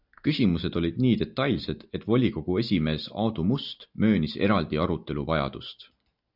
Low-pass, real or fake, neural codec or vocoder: 5.4 kHz; real; none